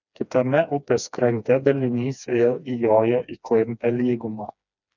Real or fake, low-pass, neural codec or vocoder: fake; 7.2 kHz; codec, 16 kHz, 2 kbps, FreqCodec, smaller model